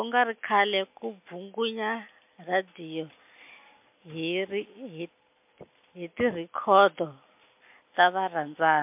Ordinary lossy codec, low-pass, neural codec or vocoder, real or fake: MP3, 32 kbps; 3.6 kHz; none; real